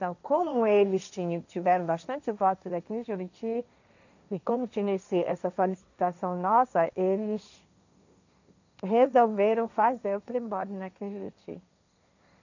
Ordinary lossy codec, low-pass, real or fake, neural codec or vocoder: none; 7.2 kHz; fake; codec, 16 kHz, 1.1 kbps, Voila-Tokenizer